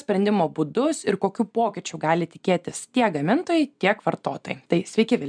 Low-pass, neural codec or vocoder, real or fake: 9.9 kHz; none; real